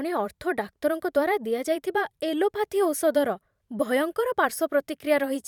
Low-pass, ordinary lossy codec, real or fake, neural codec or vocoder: 19.8 kHz; none; fake; vocoder, 44.1 kHz, 128 mel bands every 512 samples, BigVGAN v2